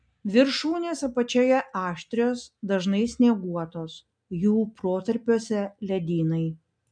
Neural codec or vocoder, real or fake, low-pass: none; real; 9.9 kHz